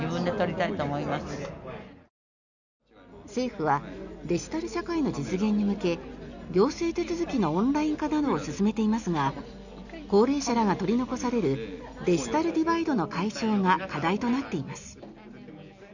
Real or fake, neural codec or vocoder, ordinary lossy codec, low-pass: real; none; none; 7.2 kHz